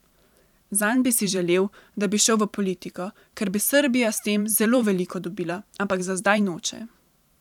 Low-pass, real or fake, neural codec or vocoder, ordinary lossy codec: 19.8 kHz; fake; vocoder, 44.1 kHz, 128 mel bands every 512 samples, BigVGAN v2; none